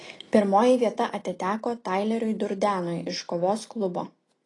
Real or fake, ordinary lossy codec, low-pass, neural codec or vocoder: real; AAC, 32 kbps; 10.8 kHz; none